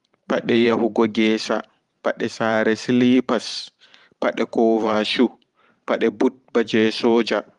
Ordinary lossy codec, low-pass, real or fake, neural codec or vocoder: Opus, 32 kbps; 10.8 kHz; fake; vocoder, 44.1 kHz, 128 mel bands every 512 samples, BigVGAN v2